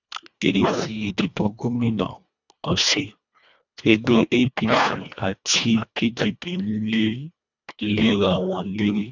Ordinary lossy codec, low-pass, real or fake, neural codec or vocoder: none; 7.2 kHz; fake; codec, 24 kHz, 1.5 kbps, HILCodec